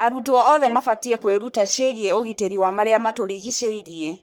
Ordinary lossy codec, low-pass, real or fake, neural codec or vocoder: none; none; fake; codec, 44.1 kHz, 1.7 kbps, Pupu-Codec